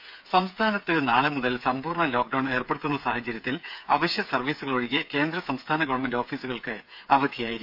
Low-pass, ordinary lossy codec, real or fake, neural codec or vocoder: 5.4 kHz; none; fake; codec, 16 kHz, 8 kbps, FreqCodec, smaller model